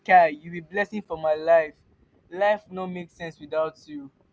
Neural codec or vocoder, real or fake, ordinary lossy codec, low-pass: none; real; none; none